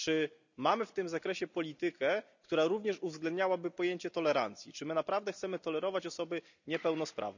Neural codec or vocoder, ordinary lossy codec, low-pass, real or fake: none; none; 7.2 kHz; real